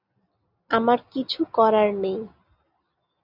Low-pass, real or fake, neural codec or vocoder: 5.4 kHz; real; none